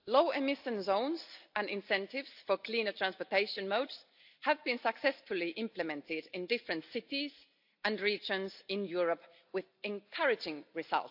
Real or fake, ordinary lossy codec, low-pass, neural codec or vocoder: real; AAC, 48 kbps; 5.4 kHz; none